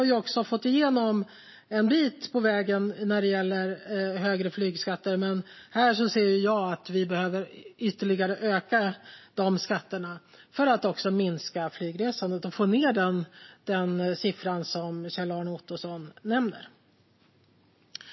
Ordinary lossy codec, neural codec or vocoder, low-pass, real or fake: MP3, 24 kbps; none; 7.2 kHz; real